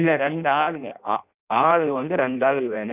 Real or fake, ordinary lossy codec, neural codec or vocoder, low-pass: fake; none; codec, 16 kHz in and 24 kHz out, 0.6 kbps, FireRedTTS-2 codec; 3.6 kHz